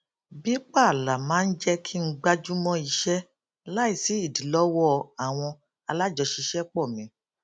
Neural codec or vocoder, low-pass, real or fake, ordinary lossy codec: none; none; real; none